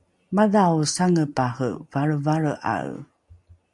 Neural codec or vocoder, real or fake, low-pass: none; real; 10.8 kHz